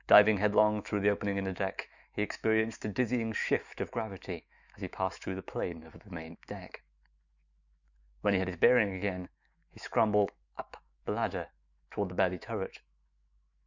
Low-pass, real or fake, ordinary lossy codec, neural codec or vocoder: 7.2 kHz; fake; Opus, 64 kbps; autoencoder, 48 kHz, 128 numbers a frame, DAC-VAE, trained on Japanese speech